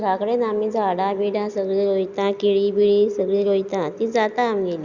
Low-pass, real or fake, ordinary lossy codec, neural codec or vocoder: 7.2 kHz; real; Opus, 64 kbps; none